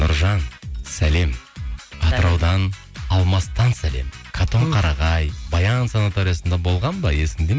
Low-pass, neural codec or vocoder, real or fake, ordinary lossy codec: none; none; real; none